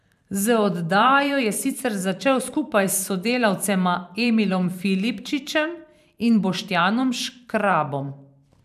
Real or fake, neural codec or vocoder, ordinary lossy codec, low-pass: fake; vocoder, 44.1 kHz, 128 mel bands every 512 samples, BigVGAN v2; none; 14.4 kHz